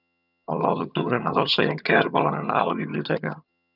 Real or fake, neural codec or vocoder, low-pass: fake; vocoder, 22.05 kHz, 80 mel bands, HiFi-GAN; 5.4 kHz